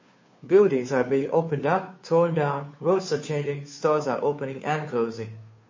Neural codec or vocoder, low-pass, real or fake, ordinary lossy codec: codec, 16 kHz, 2 kbps, FunCodec, trained on Chinese and English, 25 frames a second; 7.2 kHz; fake; MP3, 32 kbps